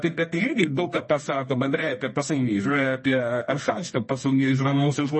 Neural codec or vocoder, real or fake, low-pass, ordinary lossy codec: codec, 24 kHz, 0.9 kbps, WavTokenizer, medium music audio release; fake; 10.8 kHz; MP3, 32 kbps